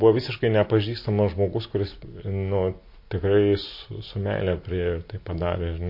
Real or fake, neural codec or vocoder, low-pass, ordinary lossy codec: real; none; 5.4 kHz; MP3, 32 kbps